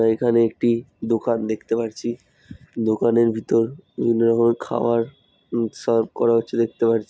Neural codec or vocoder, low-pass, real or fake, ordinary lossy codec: none; none; real; none